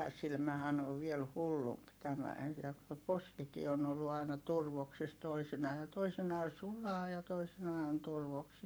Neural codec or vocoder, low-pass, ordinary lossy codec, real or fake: codec, 44.1 kHz, 7.8 kbps, Pupu-Codec; none; none; fake